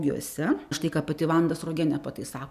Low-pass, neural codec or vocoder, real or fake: 14.4 kHz; none; real